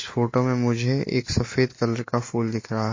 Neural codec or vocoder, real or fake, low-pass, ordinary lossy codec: none; real; 7.2 kHz; MP3, 32 kbps